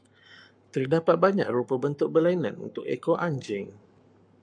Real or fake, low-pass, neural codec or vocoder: fake; 9.9 kHz; codec, 44.1 kHz, 7.8 kbps, Pupu-Codec